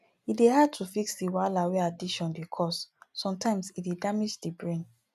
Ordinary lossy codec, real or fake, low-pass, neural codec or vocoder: none; real; 14.4 kHz; none